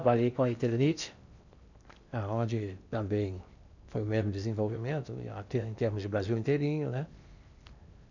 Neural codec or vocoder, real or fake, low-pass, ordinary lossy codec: codec, 16 kHz in and 24 kHz out, 0.8 kbps, FocalCodec, streaming, 65536 codes; fake; 7.2 kHz; none